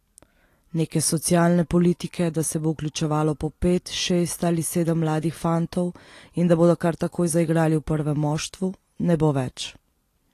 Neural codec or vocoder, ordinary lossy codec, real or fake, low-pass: none; AAC, 48 kbps; real; 14.4 kHz